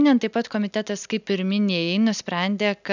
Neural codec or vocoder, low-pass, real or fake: none; 7.2 kHz; real